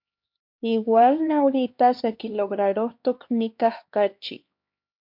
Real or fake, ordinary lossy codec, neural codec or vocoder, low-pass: fake; MP3, 48 kbps; codec, 16 kHz, 2 kbps, X-Codec, HuBERT features, trained on LibriSpeech; 5.4 kHz